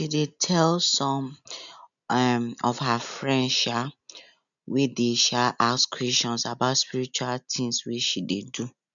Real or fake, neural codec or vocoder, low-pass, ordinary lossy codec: real; none; 7.2 kHz; none